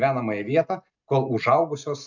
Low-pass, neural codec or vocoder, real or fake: 7.2 kHz; none; real